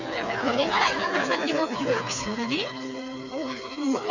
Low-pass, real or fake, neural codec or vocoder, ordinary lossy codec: 7.2 kHz; fake; codec, 16 kHz, 4 kbps, FreqCodec, smaller model; none